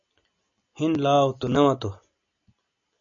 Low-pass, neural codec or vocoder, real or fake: 7.2 kHz; none; real